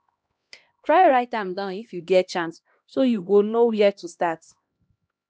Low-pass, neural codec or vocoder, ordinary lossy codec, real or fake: none; codec, 16 kHz, 1 kbps, X-Codec, HuBERT features, trained on LibriSpeech; none; fake